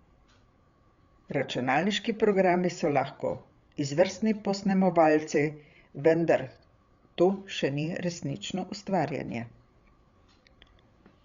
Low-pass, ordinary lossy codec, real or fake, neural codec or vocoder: 7.2 kHz; Opus, 64 kbps; fake; codec, 16 kHz, 8 kbps, FreqCodec, larger model